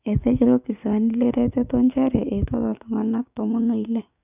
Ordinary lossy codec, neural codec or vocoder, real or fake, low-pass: none; codec, 16 kHz, 6 kbps, DAC; fake; 3.6 kHz